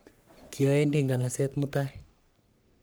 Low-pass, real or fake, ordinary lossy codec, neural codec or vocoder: none; fake; none; codec, 44.1 kHz, 3.4 kbps, Pupu-Codec